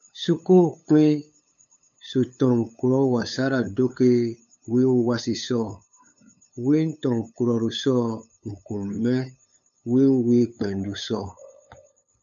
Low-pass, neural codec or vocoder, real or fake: 7.2 kHz; codec, 16 kHz, 8 kbps, FunCodec, trained on LibriTTS, 25 frames a second; fake